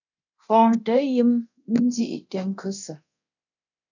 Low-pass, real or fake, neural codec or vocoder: 7.2 kHz; fake; codec, 24 kHz, 0.9 kbps, DualCodec